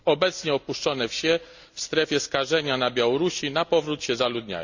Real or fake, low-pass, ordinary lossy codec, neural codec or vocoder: real; 7.2 kHz; Opus, 64 kbps; none